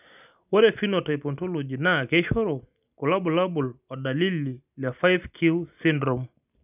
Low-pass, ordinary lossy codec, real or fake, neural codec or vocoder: 3.6 kHz; none; real; none